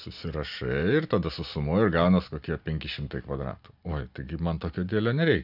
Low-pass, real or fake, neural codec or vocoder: 5.4 kHz; real; none